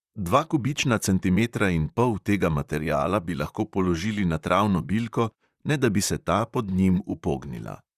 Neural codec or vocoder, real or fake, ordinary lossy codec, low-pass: vocoder, 44.1 kHz, 128 mel bands, Pupu-Vocoder; fake; Opus, 64 kbps; 14.4 kHz